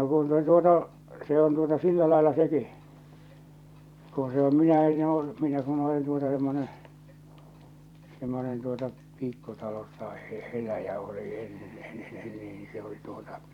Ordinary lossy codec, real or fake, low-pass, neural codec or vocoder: none; fake; 19.8 kHz; vocoder, 44.1 kHz, 128 mel bands every 512 samples, BigVGAN v2